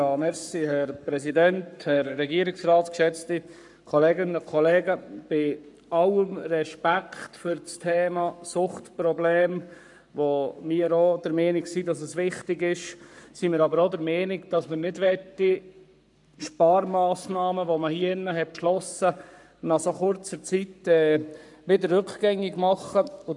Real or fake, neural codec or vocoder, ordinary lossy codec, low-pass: fake; codec, 44.1 kHz, 7.8 kbps, Pupu-Codec; none; 10.8 kHz